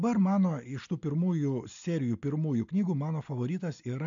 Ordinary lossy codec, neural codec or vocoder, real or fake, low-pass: AAC, 64 kbps; none; real; 7.2 kHz